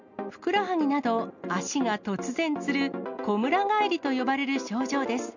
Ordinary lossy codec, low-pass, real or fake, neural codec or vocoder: none; 7.2 kHz; real; none